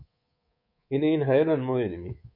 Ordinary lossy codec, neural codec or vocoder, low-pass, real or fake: MP3, 32 kbps; codec, 24 kHz, 3.1 kbps, DualCodec; 5.4 kHz; fake